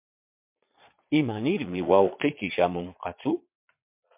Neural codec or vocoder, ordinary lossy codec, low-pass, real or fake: vocoder, 22.05 kHz, 80 mel bands, Vocos; MP3, 32 kbps; 3.6 kHz; fake